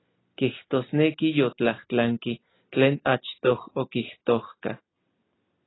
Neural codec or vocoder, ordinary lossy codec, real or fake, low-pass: none; AAC, 16 kbps; real; 7.2 kHz